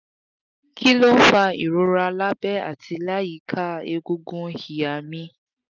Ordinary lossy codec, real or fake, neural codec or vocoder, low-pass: none; real; none; 7.2 kHz